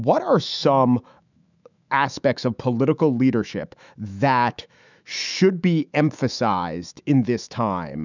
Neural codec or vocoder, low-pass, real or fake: autoencoder, 48 kHz, 128 numbers a frame, DAC-VAE, trained on Japanese speech; 7.2 kHz; fake